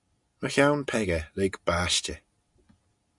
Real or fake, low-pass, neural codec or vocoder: real; 10.8 kHz; none